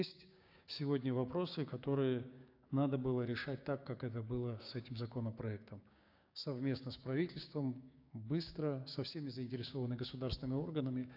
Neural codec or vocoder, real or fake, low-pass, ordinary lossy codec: codec, 16 kHz, 6 kbps, DAC; fake; 5.4 kHz; AAC, 48 kbps